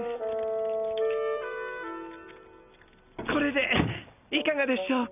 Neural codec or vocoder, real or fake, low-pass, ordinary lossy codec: none; real; 3.6 kHz; none